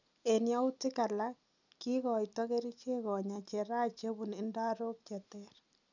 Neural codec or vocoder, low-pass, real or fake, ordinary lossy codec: none; 7.2 kHz; real; none